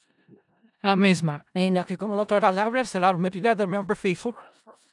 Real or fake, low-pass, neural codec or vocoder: fake; 10.8 kHz; codec, 16 kHz in and 24 kHz out, 0.4 kbps, LongCat-Audio-Codec, four codebook decoder